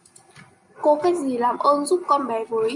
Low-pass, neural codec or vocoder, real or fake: 10.8 kHz; none; real